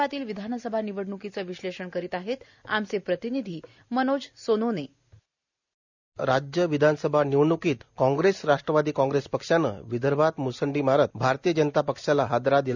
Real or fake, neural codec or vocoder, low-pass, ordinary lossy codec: real; none; 7.2 kHz; none